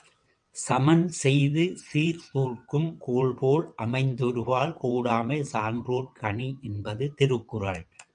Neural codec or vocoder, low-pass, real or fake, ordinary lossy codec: vocoder, 22.05 kHz, 80 mel bands, WaveNeXt; 9.9 kHz; fake; MP3, 96 kbps